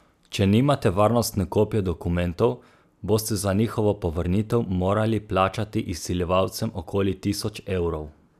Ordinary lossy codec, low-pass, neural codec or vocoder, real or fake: none; 14.4 kHz; none; real